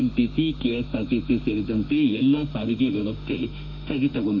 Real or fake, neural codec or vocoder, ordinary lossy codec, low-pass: fake; autoencoder, 48 kHz, 32 numbers a frame, DAC-VAE, trained on Japanese speech; none; 7.2 kHz